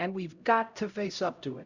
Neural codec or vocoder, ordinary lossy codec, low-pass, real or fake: codec, 16 kHz, 0.5 kbps, X-Codec, HuBERT features, trained on LibriSpeech; Opus, 64 kbps; 7.2 kHz; fake